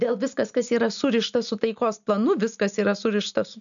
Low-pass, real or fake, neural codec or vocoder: 7.2 kHz; real; none